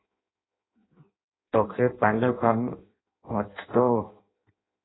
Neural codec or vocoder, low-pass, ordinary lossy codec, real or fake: codec, 16 kHz in and 24 kHz out, 0.6 kbps, FireRedTTS-2 codec; 7.2 kHz; AAC, 16 kbps; fake